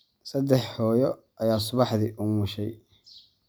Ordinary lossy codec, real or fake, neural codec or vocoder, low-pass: none; real; none; none